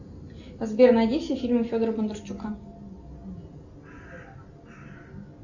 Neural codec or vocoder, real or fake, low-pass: none; real; 7.2 kHz